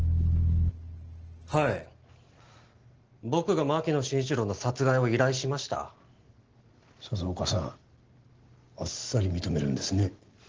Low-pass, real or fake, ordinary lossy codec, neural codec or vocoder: 7.2 kHz; real; Opus, 16 kbps; none